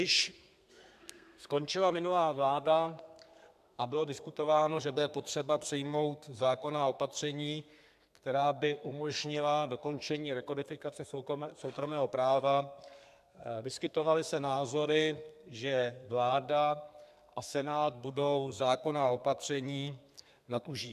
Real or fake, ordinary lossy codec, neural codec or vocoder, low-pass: fake; AAC, 96 kbps; codec, 32 kHz, 1.9 kbps, SNAC; 14.4 kHz